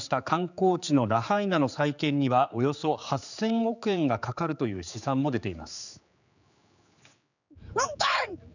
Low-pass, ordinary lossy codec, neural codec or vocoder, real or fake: 7.2 kHz; none; codec, 16 kHz, 4 kbps, X-Codec, HuBERT features, trained on general audio; fake